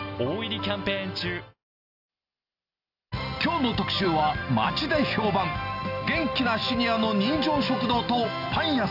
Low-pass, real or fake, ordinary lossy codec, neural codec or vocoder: 5.4 kHz; real; AAC, 48 kbps; none